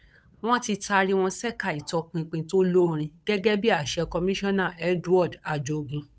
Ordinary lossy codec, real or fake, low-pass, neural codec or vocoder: none; fake; none; codec, 16 kHz, 8 kbps, FunCodec, trained on Chinese and English, 25 frames a second